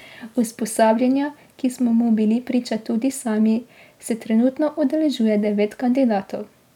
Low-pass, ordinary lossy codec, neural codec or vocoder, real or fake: 19.8 kHz; none; none; real